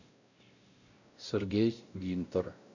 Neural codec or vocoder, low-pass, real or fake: codec, 16 kHz, 0.5 kbps, X-Codec, WavLM features, trained on Multilingual LibriSpeech; 7.2 kHz; fake